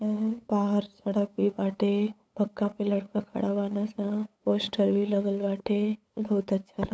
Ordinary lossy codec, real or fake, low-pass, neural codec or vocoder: none; fake; none; codec, 16 kHz, 8 kbps, FunCodec, trained on LibriTTS, 25 frames a second